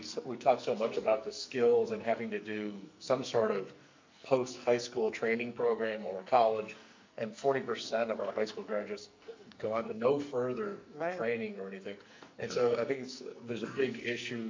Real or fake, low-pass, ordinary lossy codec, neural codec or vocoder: fake; 7.2 kHz; MP3, 48 kbps; codec, 44.1 kHz, 2.6 kbps, SNAC